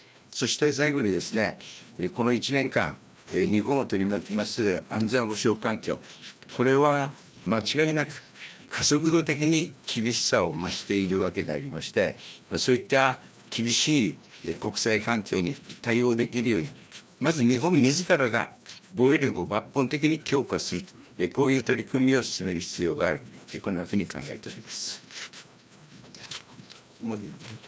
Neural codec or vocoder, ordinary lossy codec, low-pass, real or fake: codec, 16 kHz, 1 kbps, FreqCodec, larger model; none; none; fake